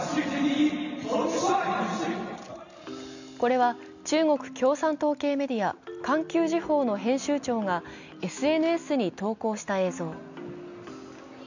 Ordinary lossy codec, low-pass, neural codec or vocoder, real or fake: none; 7.2 kHz; none; real